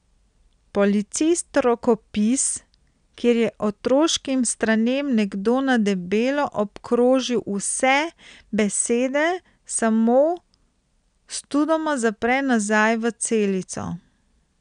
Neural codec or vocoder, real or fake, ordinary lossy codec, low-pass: none; real; none; 9.9 kHz